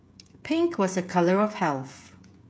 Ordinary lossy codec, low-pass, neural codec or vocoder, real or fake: none; none; codec, 16 kHz, 16 kbps, FreqCodec, smaller model; fake